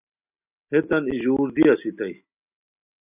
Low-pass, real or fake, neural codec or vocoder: 3.6 kHz; real; none